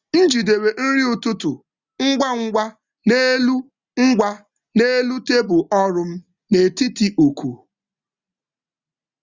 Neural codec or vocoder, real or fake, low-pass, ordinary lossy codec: none; real; 7.2 kHz; Opus, 64 kbps